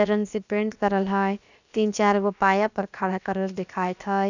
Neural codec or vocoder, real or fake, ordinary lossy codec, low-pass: codec, 16 kHz, about 1 kbps, DyCAST, with the encoder's durations; fake; none; 7.2 kHz